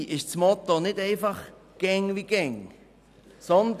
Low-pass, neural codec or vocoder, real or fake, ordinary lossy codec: 14.4 kHz; none; real; none